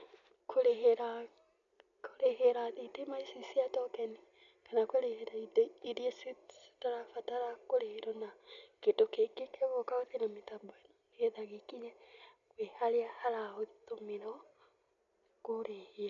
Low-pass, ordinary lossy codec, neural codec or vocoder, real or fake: 7.2 kHz; none; none; real